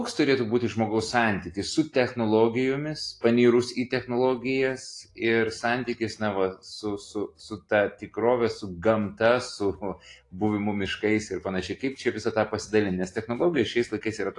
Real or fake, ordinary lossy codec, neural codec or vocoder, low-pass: real; AAC, 48 kbps; none; 10.8 kHz